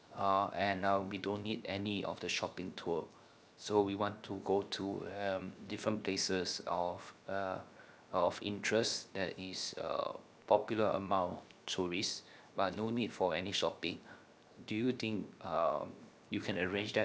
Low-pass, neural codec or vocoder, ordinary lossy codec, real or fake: none; codec, 16 kHz, 0.7 kbps, FocalCodec; none; fake